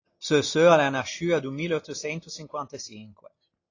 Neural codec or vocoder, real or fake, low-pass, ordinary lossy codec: none; real; 7.2 kHz; AAC, 32 kbps